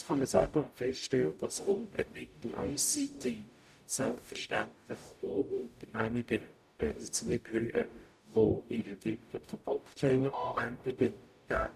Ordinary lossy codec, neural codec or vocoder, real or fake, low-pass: none; codec, 44.1 kHz, 0.9 kbps, DAC; fake; 14.4 kHz